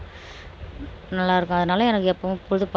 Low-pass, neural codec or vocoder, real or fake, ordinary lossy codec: none; none; real; none